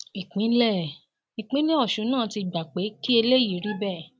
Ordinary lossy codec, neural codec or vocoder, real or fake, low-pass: none; none; real; none